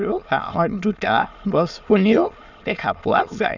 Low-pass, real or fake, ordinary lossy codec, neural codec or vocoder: 7.2 kHz; fake; none; autoencoder, 22.05 kHz, a latent of 192 numbers a frame, VITS, trained on many speakers